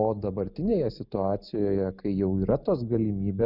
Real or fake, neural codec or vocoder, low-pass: real; none; 5.4 kHz